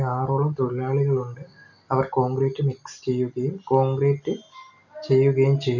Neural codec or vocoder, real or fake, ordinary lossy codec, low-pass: none; real; none; 7.2 kHz